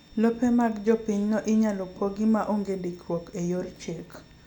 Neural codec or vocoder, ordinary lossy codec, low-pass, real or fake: none; none; 19.8 kHz; real